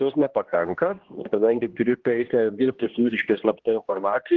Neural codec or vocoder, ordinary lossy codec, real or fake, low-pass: codec, 16 kHz, 1 kbps, X-Codec, HuBERT features, trained on general audio; Opus, 16 kbps; fake; 7.2 kHz